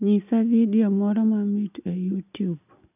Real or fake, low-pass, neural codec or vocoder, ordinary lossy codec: real; 3.6 kHz; none; none